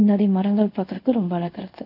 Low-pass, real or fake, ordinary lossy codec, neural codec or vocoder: 5.4 kHz; fake; none; codec, 24 kHz, 0.5 kbps, DualCodec